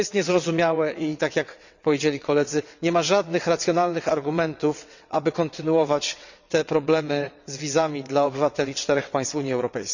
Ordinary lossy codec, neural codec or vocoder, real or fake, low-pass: none; vocoder, 22.05 kHz, 80 mel bands, WaveNeXt; fake; 7.2 kHz